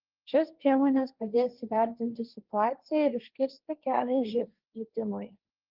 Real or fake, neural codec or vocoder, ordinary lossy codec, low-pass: fake; codec, 16 kHz, 1.1 kbps, Voila-Tokenizer; Opus, 32 kbps; 5.4 kHz